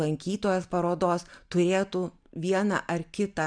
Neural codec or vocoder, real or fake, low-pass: none; real; 9.9 kHz